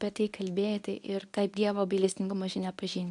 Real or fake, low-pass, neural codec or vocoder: fake; 10.8 kHz; codec, 24 kHz, 0.9 kbps, WavTokenizer, medium speech release version 1